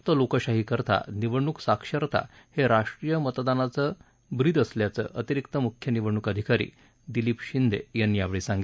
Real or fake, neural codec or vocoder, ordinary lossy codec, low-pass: real; none; none; none